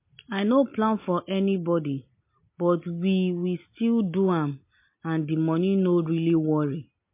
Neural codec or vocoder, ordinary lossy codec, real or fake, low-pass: none; MP3, 24 kbps; real; 3.6 kHz